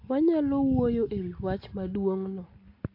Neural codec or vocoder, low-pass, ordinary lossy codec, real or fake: none; 5.4 kHz; AAC, 32 kbps; real